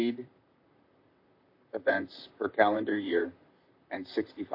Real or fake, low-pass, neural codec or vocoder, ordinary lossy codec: fake; 5.4 kHz; vocoder, 44.1 kHz, 128 mel bands, Pupu-Vocoder; MP3, 32 kbps